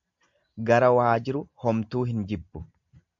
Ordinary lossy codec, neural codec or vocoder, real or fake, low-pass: AAC, 64 kbps; none; real; 7.2 kHz